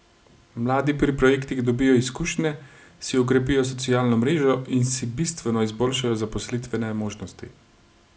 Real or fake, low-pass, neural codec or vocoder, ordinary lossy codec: real; none; none; none